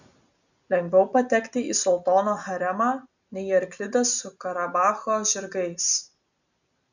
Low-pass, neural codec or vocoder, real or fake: 7.2 kHz; none; real